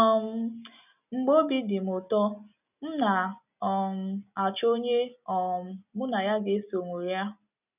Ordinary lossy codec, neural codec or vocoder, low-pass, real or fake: none; none; 3.6 kHz; real